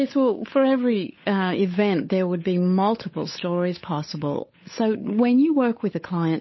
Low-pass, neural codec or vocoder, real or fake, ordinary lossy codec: 7.2 kHz; codec, 16 kHz, 8 kbps, FunCodec, trained on Chinese and English, 25 frames a second; fake; MP3, 24 kbps